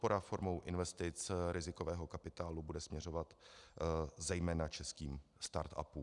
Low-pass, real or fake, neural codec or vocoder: 10.8 kHz; real; none